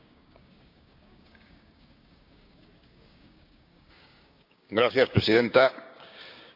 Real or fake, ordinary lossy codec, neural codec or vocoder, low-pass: fake; AAC, 48 kbps; codec, 16 kHz, 6 kbps, DAC; 5.4 kHz